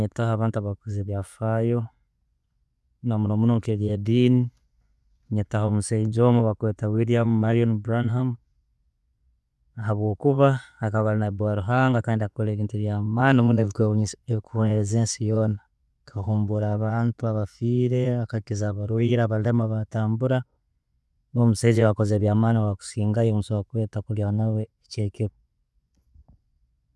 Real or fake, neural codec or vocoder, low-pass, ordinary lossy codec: fake; vocoder, 24 kHz, 100 mel bands, Vocos; none; none